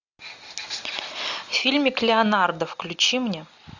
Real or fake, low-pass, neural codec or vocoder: real; 7.2 kHz; none